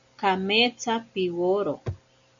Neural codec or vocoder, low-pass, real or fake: none; 7.2 kHz; real